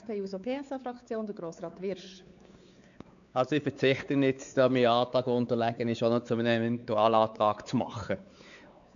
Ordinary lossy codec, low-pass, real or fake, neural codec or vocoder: none; 7.2 kHz; fake; codec, 16 kHz, 4 kbps, X-Codec, WavLM features, trained on Multilingual LibriSpeech